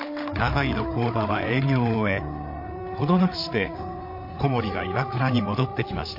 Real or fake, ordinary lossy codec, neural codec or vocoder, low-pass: fake; MP3, 32 kbps; codec, 16 kHz, 8 kbps, FreqCodec, larger model; 5.4 kHz